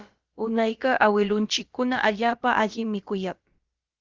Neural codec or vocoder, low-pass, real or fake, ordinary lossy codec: codec, 16 kHz, about 1 kbps, DyCAST, with the encoder's durations; 7.2 kHz; fake; Opus, 32 kbps